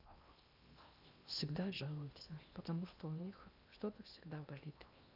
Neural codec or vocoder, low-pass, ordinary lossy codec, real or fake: codec, 16 kHz in and 24 kHz out, 0.8 kbps, FocalCodec, streaming, 65536 codes; 5.4 kHz; none; fake